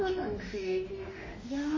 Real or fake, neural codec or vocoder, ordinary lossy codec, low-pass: fake; autoencoder, 48 kHz, 32 numbers a frame, DAC-VAE, trained on Japanese speech; MP3, 32 kbps; 7.2 kHz